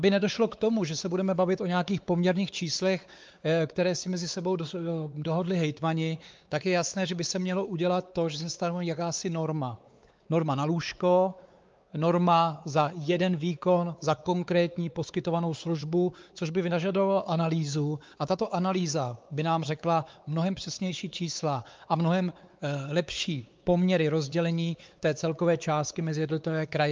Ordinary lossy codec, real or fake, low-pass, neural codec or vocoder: Opus, 24 kbps; fake; 7.2 kHz; codec, 16 kHz, 4 kbps, X-Codec, WavLM features, trained on Multilingual LibriSpeech